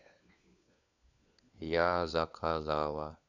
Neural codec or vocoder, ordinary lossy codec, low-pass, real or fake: codec, 16 kHz, 4 kbps, FunCodec, trained on LibriTTS, 50 frames a second; none; 7.2 kHz; fake